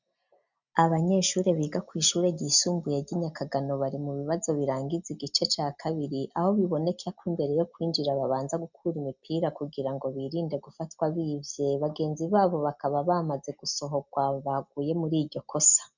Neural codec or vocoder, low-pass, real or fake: none; 7.2 kHz; real